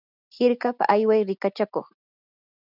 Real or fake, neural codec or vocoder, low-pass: real; none; 5.4 kHz